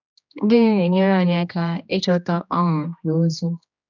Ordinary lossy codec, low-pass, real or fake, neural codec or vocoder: none; 7.2 kHz; fake; codec, 16 kHz, 1 kbps, X-Codec, HuBERT features, trained on general audio